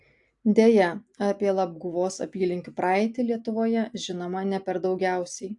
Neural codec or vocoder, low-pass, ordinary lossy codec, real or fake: none; 10.8 kHz; AAC, 64 kbps; real